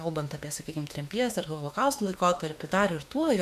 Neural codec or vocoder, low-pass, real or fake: autoencoder, 48 kHz, 32 numbers a frame, DAC-VAE, trained on Japanese speech; 14.4 kHz; fake